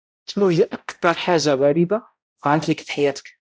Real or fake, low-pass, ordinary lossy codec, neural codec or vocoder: fake; none; none; codec, 16 kHz, 0.5 kbps, X-Codec, HuBERT features, trained on balanced general audio